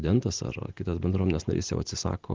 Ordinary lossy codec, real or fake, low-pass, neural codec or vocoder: Opus, 16 kbps; real; 7.2 kHz; none